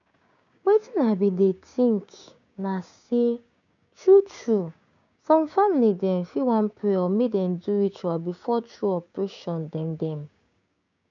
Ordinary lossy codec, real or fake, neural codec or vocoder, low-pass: none; fake; codec, 16 kHz, 6 kbps, DAC; 7.2 kHz